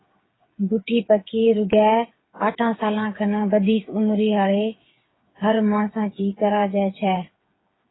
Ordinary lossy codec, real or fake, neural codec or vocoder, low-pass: AAC, 16 kbps; fake; codec, 16 kHz, 8 kbps, FreqCodec, smaller model; 7.2 kHz